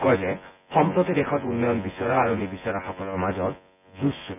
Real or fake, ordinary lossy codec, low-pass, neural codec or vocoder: fake; none; 3.6 kHz; vocoder, 24 kHz, 100 mel bands, Vocos